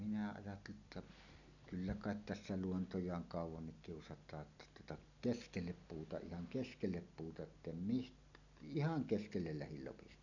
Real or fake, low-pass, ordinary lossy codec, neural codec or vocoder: real; 7.2 kHz; none; none